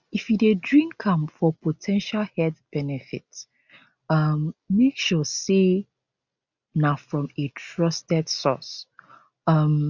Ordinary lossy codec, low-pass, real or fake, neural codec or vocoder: none; 7.2 kHz; real; none